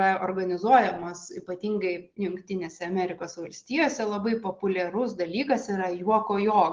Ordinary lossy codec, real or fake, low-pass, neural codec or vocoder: Opus, 16 kbps; real; 7.2 kHz; none